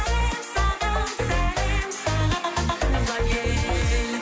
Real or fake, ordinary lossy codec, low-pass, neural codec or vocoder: real; none; none; none